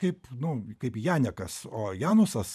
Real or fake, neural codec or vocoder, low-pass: fake; vocoder, 44.1 kHz, 128 mel bands every 512 samples, BigVGAN v2; 14.4 kHz